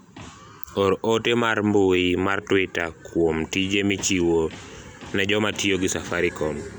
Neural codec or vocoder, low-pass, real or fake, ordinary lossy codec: none; none; real; none